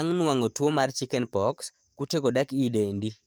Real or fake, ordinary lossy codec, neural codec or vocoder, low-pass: fake; none; codec, 44.1 kHz, 7.8 kbps, DAC; none